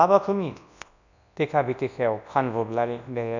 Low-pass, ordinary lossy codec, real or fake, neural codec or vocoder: 7.2 kHz; none; fake; codec, 24 kHz, 0.9 kbps, WavTokenizer, large speech release